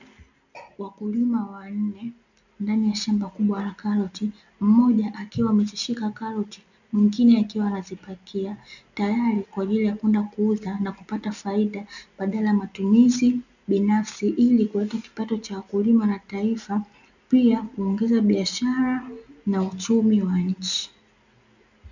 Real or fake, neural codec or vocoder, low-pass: real; none; 7.2 kHz